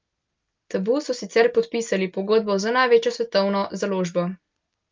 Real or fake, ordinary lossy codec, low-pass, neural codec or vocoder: real; Opus, 24 kbps; 7.2 kHz; none